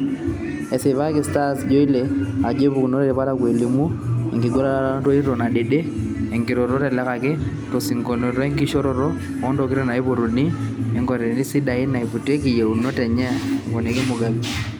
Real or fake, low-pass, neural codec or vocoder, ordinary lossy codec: real; none; none; none